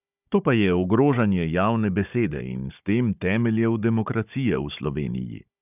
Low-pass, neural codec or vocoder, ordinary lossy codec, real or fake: 3.6 kHz; codec, 16 kHz, 16 kbps, FunCodec, trained on Chinese and English, 50 frames a second; none; fake